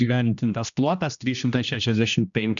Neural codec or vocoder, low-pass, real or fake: codec, 16 kHz, 1 kbps, X-Codec, HuBERT features, trained on general audio; 7.2 kHz; fake